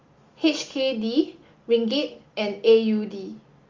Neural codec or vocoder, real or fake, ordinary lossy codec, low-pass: none; real; Opus, 32 kbps; 7.2 kHz